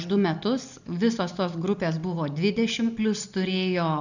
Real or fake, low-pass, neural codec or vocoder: real; 7.2 kHz; none